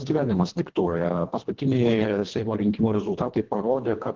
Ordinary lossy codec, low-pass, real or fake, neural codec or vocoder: Opus, 16 kbps; 7.2 kHz; fake; codec, 24 kHz, 1.5 kbps, HILCodec